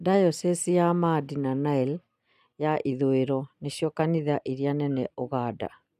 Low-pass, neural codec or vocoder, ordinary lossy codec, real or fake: 14.4 kHz; none; none; real